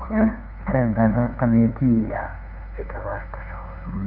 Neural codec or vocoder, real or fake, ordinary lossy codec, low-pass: codec, 16 kHz, 2 kbps, FunCodec, trained on LibriTTS, 25 frames a second; fake; none; 5.4 kHz